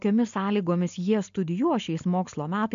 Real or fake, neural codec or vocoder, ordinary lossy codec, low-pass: real; none; MP3, 48 kbps; 7.2 kHz